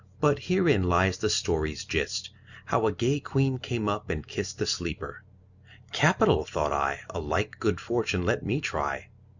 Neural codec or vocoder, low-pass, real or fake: none; 7.2 kHz; real